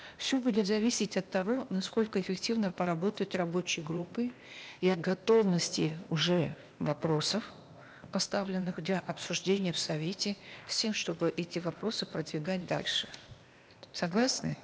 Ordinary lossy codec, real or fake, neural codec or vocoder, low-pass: none; fake; codec, 16 kHz, 0.8 kbps, ZipCodec; none